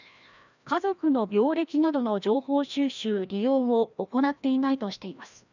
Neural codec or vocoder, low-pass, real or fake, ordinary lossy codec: codec, 16 kHz, 1 kbps, FreqCodec, larger model; 7.2 kHz; fake; none